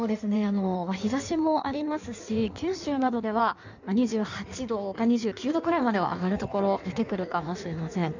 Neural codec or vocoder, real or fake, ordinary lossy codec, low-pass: codec, 16 kHz in and 24 kHz out, 1.1 kbps, FireRedTTS-2 codec; fake; none; 7.2 kHz